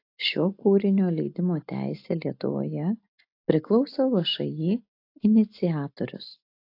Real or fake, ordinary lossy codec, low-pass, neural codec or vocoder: real; AAC, 32 kbps; 5.4 kHz; none